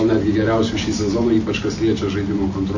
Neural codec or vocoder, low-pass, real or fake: none; 7.2 kHz; real